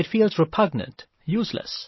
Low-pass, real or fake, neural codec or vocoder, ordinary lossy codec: 7.2 kHz; real; none; MP3, 24 kbps